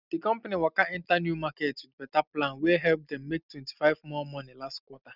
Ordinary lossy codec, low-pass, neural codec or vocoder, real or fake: none; 5.4 kHz; none; real